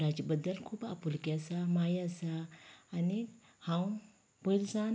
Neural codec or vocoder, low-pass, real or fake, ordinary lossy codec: none; none; real; none